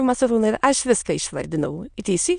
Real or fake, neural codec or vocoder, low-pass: fake; autoencoder, 22.05 kHz, a latent of 192 numbers a frame, VITS, trained on many speakers; 9.9 kHz